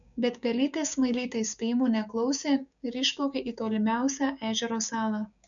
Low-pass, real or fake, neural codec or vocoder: 7.2 kHz; fake; codec, 16 kHz, 6 kbps, DAC